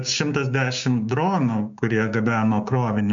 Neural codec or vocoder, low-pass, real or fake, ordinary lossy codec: codec, 16 kHz, 6 kbps, DAC; 7.2 kHz; fake; MP3, 64 kbps